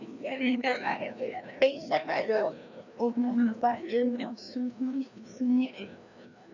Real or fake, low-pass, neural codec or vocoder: fake; 7.2 kHz; codec, 16 kHz, 1 kbps, FreqCodec, larger model